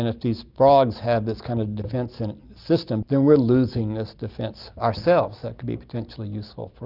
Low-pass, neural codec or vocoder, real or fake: 5.4 kHz; none; real